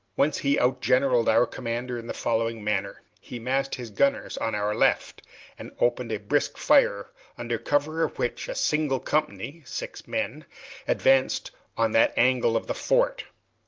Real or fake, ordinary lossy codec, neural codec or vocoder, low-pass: real; Opus, 32 kbps; none; 7.2 kHz